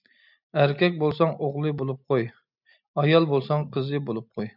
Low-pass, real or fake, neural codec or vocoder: 5.4 kHz; real; none